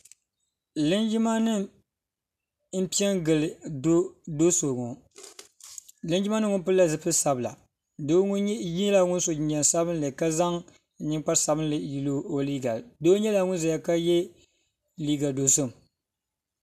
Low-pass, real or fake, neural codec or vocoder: 14.4 kHz; real; none